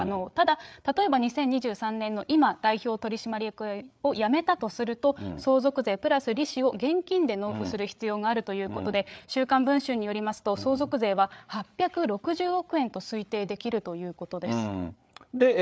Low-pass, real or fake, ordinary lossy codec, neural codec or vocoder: none; fake; none; codec, 16 kHz, 8 kbps, FreqCodec, larger model